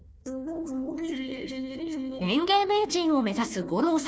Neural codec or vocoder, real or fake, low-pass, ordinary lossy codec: codec, 16 kHz, 1 kbps, FunCodec, trained on Chinese and English, 50 frames a second; fake; none; none